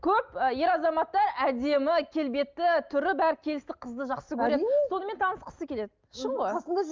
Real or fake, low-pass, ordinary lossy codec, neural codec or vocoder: real; 7.2 kHz; Opus, 32 kbps; none